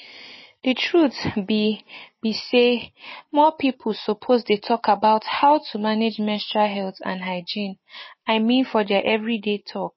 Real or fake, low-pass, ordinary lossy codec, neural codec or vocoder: real; 7.2 kHz; MP3, 24 kbps; none